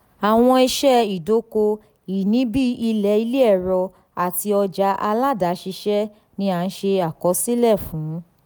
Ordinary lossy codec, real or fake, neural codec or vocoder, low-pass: none; real; none; none